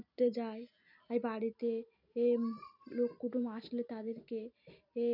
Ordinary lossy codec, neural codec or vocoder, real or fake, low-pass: none; none; real; 5.4 kHz